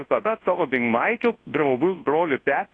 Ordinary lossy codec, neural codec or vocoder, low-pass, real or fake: AAC, 32 kbps; codec, 24 kHz, 0.9 kbps, WavTokenizer, large speech release; 9.9 kHz; fake